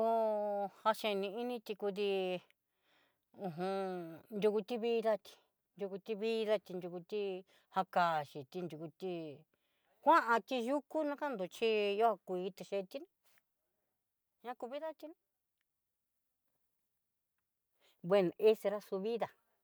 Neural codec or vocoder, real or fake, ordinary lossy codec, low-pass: none; real; none; none